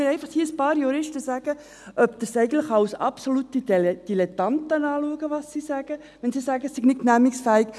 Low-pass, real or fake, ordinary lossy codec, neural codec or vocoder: none; real; none; none